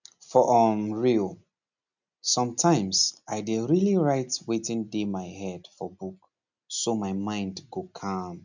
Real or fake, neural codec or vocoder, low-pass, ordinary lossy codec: real; none; 7.2 kHz; none